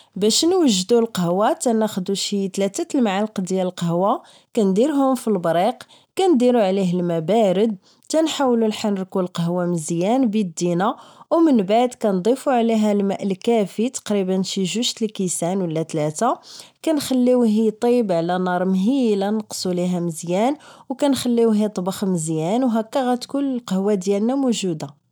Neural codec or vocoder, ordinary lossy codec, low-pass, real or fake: none; none; none; real